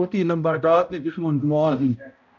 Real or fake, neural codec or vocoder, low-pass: fake; codec, 16 kHz, 0.5 kbps, X-Codec, HuBERT features, trained on balanced general audio; 7.2 kHz